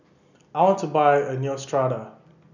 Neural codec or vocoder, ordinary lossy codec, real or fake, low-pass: none; none; real; 7.2 kHz